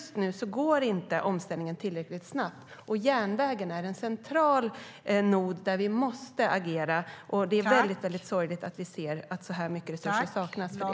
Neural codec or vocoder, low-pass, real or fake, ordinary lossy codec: none; none; real; none